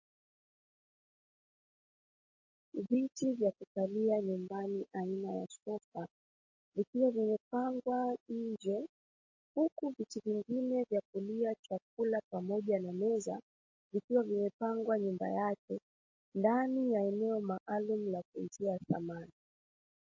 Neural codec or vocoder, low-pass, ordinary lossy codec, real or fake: none; 7.2 kHz; MP3, 32 kbps; real